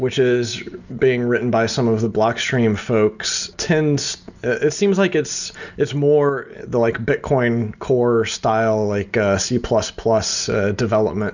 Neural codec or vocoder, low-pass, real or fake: none; 7.2 kHz; real